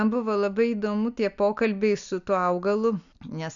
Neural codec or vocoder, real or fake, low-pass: none; real; 7.2 kHz